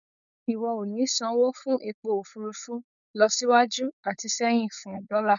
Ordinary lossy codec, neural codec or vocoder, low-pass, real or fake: none; codec, 16 kHz, 4.8 kbps, FACodec; 7.2 kHz; fake